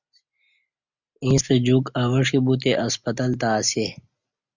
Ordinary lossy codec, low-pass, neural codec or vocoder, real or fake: Opus, 64 kbps; 7.2 kHz; none; real